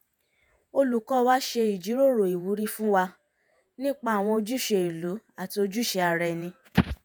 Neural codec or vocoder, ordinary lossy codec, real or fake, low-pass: vocoder, 48 kHz, 128 mel bands, Vocos; none; fake; none